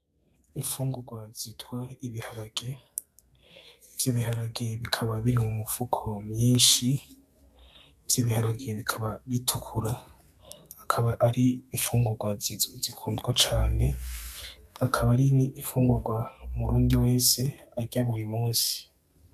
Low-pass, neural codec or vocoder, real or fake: 14.4 kHz; codec, 32 kHz, 1.9 kbps, SNAC; fake